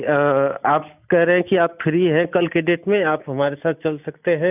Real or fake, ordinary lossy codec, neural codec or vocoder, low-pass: real; none; none; 3.6 kHz